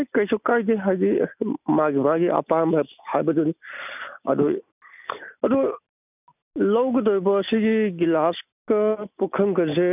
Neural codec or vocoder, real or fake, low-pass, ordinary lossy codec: none; real; 3.6 kHz; none